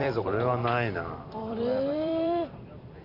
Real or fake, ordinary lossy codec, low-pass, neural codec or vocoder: fake; none; 5.4 kHz; vocoder, 44.1 kHz, 128 mel bands every 256 samples, BigVGAN v2